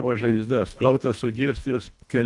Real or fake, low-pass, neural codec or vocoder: fake; 10.8 kHz; codec, 24 kHz, 1.5 kbps, HILCodec